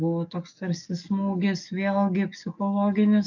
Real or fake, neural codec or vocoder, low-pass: fake; codec, 44.1 kHz, 7.8 kbps, DAC; 7.2 kHz